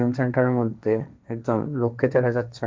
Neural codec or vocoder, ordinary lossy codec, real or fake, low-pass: codec, 16 kHz, 1.1 kbps, Voila-Tokenizer; none; fake; none